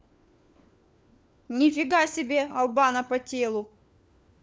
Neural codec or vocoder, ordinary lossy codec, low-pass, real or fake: codec, 16 kHz, 4 kbps, FunCodec, trained on LibriTTS, 50 frames a second; none; none; fake